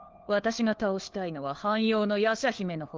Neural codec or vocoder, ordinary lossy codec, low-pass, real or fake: codec, 16 kHz, 4 kbps, FunCodec, trained on LibriTTS, 50 frames a second; Opus, 16 kbps; 7.2 kHz; fake